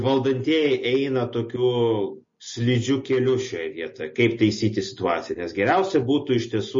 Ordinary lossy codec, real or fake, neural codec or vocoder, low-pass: MP3, 32 kbps; real; none; 7.2 kHz